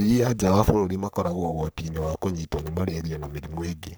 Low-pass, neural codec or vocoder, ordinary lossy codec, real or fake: none; codec, 44.1 kHz, 3.4 kbps, Pupu-Codec; none; fake